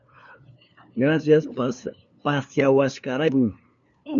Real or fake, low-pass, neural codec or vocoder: fake; 7.2 kHz; codec, 16 kHz, 2 kbps, FunCodec, trained on LibriTTS, 25 frames a second